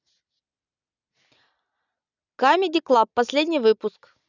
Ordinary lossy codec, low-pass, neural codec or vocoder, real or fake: none; 7.2 kHz; none; real